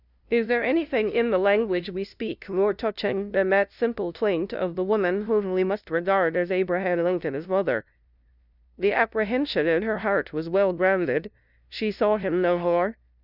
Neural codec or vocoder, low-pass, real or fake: codec, 16 kHz, 0.5 kbps, FunCodec, trained on LibriTTS, 25 frames a second; 5.4 kHz; fake